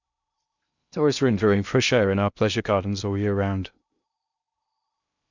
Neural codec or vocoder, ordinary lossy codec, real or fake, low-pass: codec, 16 kHz in and 24 kHz out, 0.6 kbps, FocalCodec, streaming, 2048 codes; none; fake; 7.2 kHz